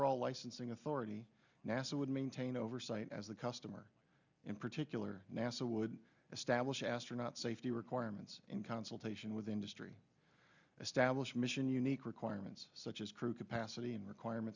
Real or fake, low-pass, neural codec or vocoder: real; 7.2 kHz; none